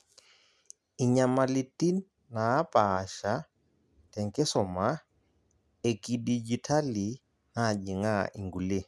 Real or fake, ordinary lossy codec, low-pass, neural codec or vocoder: real; none; none; none